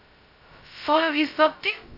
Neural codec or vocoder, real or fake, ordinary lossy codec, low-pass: codec, 16 kHz, 0.2 kbps, FocalCodec; fake; none; 5.4 kHz